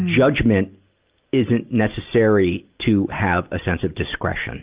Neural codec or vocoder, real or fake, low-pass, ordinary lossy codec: none; real; 3.6 kHz; Opus, 32 kbps